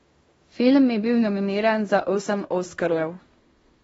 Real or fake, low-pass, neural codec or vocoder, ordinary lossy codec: fake; 10.8 kHz; codec, 16 kHz in and 24 kHz out, 0.9 kbps, LongCat-Audio-Codec, fine tuned four codebook decoder; AAC, 24 kbps